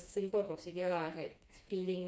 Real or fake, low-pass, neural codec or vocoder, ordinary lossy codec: fake; none; codec, 16 kHz, 2 kbps, FreqCodec, smaller model; none